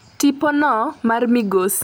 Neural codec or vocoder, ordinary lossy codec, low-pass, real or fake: none; none; none; real